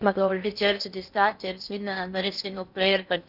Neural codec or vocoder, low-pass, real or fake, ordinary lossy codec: codec, 16 kHz in and 24 kHz out, 0.6 kbps, FocalCodec, streaming, 2048 codes; 5.4 kHz; fake; none